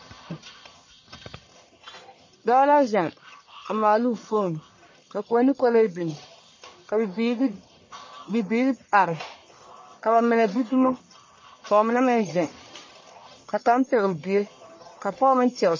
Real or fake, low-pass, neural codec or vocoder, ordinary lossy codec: fake; 7.2 kHz; codec, 44.1 kHz, 1.7 kbps, Pupu-Codec; MP3, 32 kbps